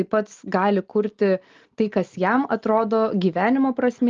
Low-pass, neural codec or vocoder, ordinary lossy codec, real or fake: 7.2 kHz; none; Opus, 32 kbps; real